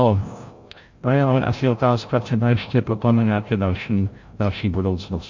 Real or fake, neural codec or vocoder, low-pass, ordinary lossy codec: fake; codec, 16 kHz, 0.5 kbps, FreqCodec, larger model; 7.2 kHz; MP3, 48 kbps